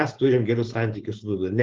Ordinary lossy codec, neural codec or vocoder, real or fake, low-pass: Opus, 16 kbps; codec, 16 kHz, 4.8 kbps, FACodec; fake; 7.2 kHz